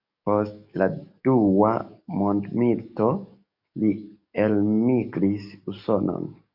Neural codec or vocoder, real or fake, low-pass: codec, 44.1 kHz, 7.8 kbps, DAC; fake; 5.4 kHz